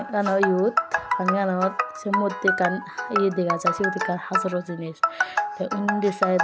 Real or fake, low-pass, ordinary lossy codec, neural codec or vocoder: real; none; none; none